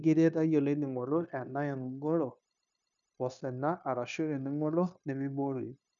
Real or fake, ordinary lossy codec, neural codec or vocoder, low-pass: fake; none; codec, 16 kHz, 0.9 kbps, LongCat-Audio-Codec; 7.2 kHz